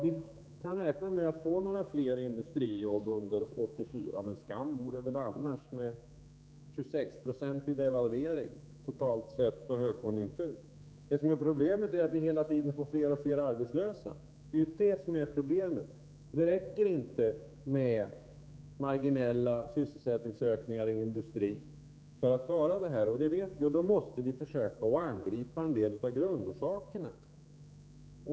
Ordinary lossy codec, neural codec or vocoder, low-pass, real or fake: none; codec, 16 kHz, 4 kbps, X-Codec, HuBERT features, trained on general audio; none; fake